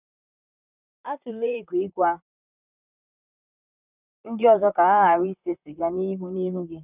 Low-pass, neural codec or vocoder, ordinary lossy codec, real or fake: 3.6 kHz; vocoder, 44.1 kHz, 128 mel bands, Pupu-Vocoder; none; fake